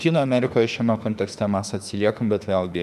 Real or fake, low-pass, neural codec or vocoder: fake; 14.4 kHz; autoencoder, 48 kHz, 32 numbers a frame, DAC-VAE, trained on Japanese speech